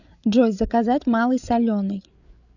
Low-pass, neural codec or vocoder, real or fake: 7.2 kHz; codec, 16 kHz, 8 kbps, FreqCodec, larger model; fake